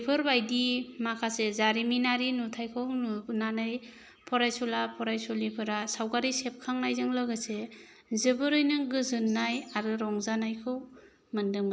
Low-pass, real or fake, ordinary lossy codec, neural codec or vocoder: none; real; none; none